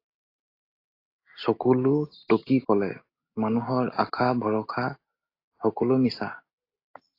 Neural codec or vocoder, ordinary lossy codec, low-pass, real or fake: none; AAC, 32 kbps; 5.4 kHz; real